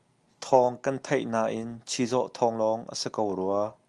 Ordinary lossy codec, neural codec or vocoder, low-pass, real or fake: Opus, 32 kbps; none; 10.8 kHz; real